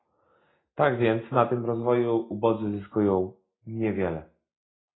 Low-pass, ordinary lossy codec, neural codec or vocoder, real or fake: 7.2 kHz; AAC, 16 kbps; none; real